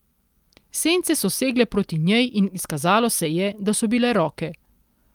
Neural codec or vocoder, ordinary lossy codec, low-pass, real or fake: none; Opus, 32 kbps; 19.8 kHz; real